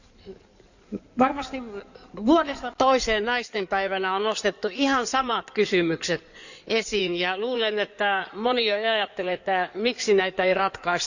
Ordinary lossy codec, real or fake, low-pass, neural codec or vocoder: none; fake; 7.2 kHz; codec, 16 kHz in and 24 kHz out, 2.2 kbps, FireRedTTS-2 codec